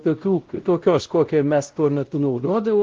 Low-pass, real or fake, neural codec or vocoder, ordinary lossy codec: 7.2 kHz; fake; codec, 16 kHz, 0.5 kbps, X-Codec, WavLM features, trained on Multilingual LibriSpeech; Opus, 16 kbps